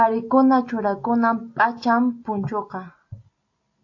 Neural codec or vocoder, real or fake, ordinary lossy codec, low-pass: none; real; AAC, 48 kbps; 7.2 kHz